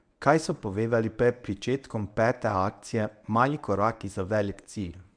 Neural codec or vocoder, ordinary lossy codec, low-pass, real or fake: codec, 24 kHz, 0.9 kbps, WavTokenizer, medium speech release version 1; none; 9.9 kHz; fake